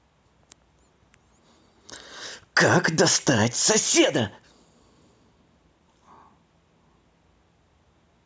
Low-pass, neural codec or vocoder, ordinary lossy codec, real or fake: none; none; none; real